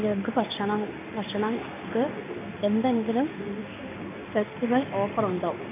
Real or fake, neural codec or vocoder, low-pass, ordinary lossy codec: fake; codec, 16 kHz in and 24 kHz out, 1 kbps, XY-Tokenizer; 3.6 kHz; AAC, 24 kbps